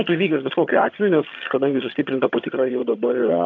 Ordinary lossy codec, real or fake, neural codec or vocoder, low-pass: AAC, 48 kbps; fake; vocoder, 22.05 kHz, 80 mel bands, HiFi-GAN; 7.2 kHz